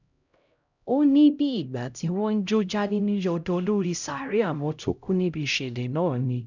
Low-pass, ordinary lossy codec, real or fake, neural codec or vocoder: 7.2 kHz; none; fake; codec, 16 kHz, 0.5 kbps, X-Codec, HuBERT features, trained on LibriSpeech